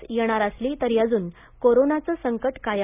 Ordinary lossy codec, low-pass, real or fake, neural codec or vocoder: none; 3.6 kHz; real; none